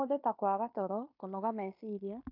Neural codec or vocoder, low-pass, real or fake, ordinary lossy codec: codec, 16 kHz in and 24 kHz out, 0.9 kbps, LongCat-Audio-Codec, fine tuned four codebook decoder; 5.4 kHz; fake; AAC, 48 kbps